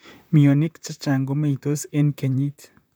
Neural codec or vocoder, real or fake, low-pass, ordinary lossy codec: vocoder, 44.1 kHz, 128 mel bands, Pupu-Vocoder; fake; none; none